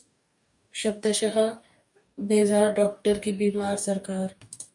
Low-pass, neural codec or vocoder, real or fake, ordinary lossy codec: 10.8 kHz; codec, 44.1 kHz, 2.6 kbps, DAC; fake; MP3, 96 kbps